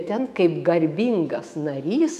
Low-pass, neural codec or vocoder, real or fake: 14.4 kHz; autoencoder, 48 kHz, 128 numbers a frame, DAC-VAE, trained on Japanese speech; fake